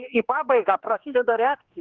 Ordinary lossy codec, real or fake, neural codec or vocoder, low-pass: Opus, 16 kbps; fake; codec, 16 kHz, 2 kbps, X-Codec, HuBERT features, trained on general audio; 7.2 kHz